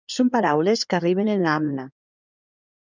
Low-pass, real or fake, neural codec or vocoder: 7.2 kHz; fake; codec, 16 kHz in and 24 kHz out, 2.2 kbps, FireRedTTS-2 codec